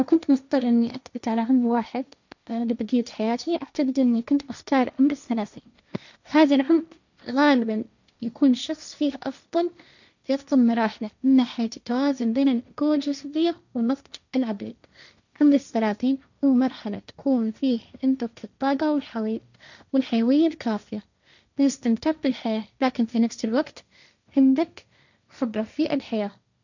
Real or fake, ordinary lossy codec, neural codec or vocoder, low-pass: fake; none; codec, 16 kHz, 1.1 kbps, Voila-Tokenizer; 7.2 kHz